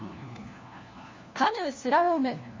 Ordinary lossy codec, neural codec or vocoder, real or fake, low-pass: MP3, 32 kbps; codec, 16 kHz, 1 kbps, FunCodec, trained on LibriTTS, 50 frames a second; fake; 7.2 kHz